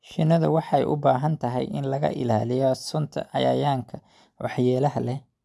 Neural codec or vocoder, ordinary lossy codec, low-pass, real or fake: none; none; none; real